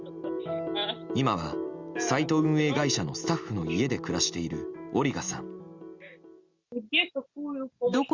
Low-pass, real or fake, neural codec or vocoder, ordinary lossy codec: 7.2 kHz; real; none; Opus, 64 kbps